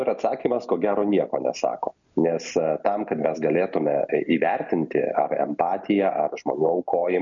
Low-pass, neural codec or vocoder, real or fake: 7.2 kHz; none; real